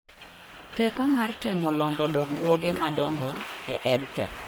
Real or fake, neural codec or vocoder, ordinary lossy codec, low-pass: fake; codec, 44.1 kHz, 1.7 kbps, Pupu-Codec; none; none